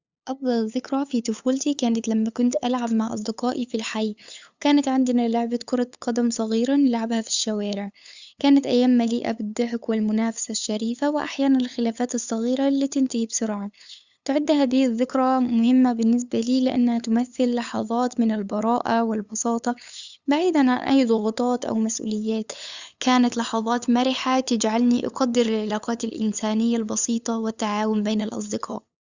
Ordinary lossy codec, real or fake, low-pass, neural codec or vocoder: Opus, 64 kbps; fake; 7.2 kHz; codec, 16 kHz, 8 kbps, FunCodec, trained on LibriTTS, 25 frames a second